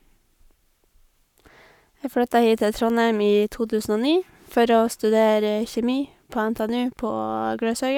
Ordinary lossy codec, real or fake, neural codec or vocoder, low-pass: none; fake; codec, 44.1 kHz, 7.8 kbps, Pupu-Codec; 19.8 kHz